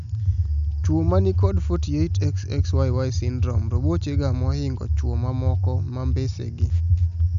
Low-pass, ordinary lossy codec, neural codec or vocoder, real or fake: 7.2 kHz; none; none; real